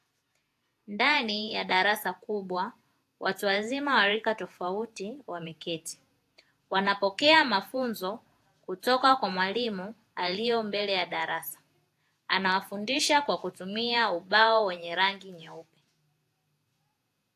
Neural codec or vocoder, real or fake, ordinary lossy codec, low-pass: vocoder, 48 kHz, 128 mel bands, Vocos; fake; AAC, 64 kbps; 14.4 kHz